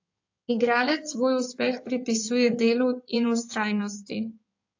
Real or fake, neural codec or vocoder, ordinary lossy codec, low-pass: fake; codec, 16 kHz in and 24 kHz out, 2.2 kbps, FireRedTTS-2 codec; AAC, 48 kbps; 7.2 kHz